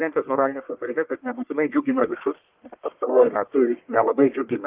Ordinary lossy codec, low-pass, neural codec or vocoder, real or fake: Opus, 32 kbps; 3.6 kHz; codec, 44.1 kHz, 1.7 kbps, Pupu-Codec; fake